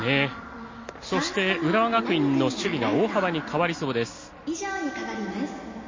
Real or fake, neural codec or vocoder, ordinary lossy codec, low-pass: real; none; MP3, 32 kbps; 7.2 kHz